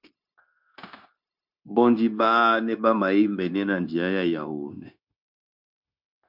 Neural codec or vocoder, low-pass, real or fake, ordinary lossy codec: codec, 16 kHz, 0.9 kbps, LongCat-Audio-Codec; 5.4 kHz; fake; MP3, 48 kbps